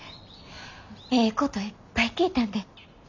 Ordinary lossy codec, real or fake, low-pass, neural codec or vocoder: none; real; 7.2 kHz; none